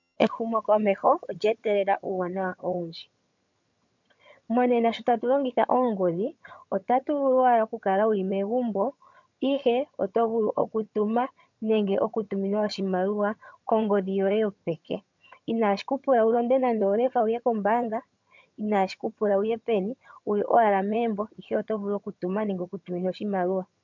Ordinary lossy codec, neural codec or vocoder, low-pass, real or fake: MP3, 64 kbps; vocoder, 22.05 kHz, 80 mel bands, HiFi-GAN; 7.2 kHz; fake